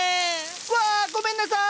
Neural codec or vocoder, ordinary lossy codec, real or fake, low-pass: none; none; real; none